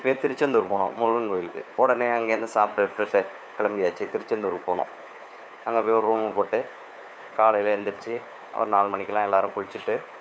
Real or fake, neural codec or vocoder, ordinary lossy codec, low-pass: fake; codec, 16 kHz, 4 kbps, FunCodec, trained on LibriTTS, 50 frames a second; none; none